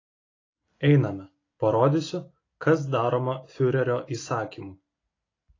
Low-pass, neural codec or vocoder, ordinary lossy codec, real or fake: 7.2 kHz; none; AAC, 32 kbps; real